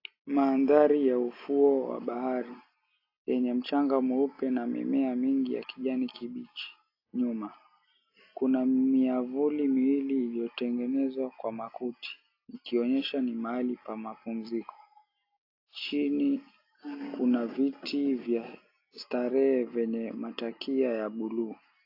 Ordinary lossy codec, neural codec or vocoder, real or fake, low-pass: AAC, 32 kbps; none; real; 5.4 kHz